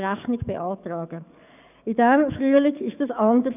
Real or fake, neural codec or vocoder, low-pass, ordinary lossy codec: fake; codec, 44.1 kHz, 7.8 kbps, DAC; 3.6 kHz; none